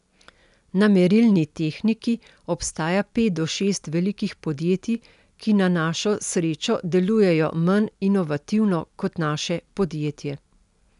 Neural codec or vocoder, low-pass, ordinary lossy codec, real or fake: none; 10.8 kHz; none; real